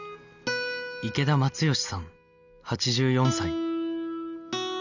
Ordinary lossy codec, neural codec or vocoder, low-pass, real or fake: none; none; 7.2 kHz; real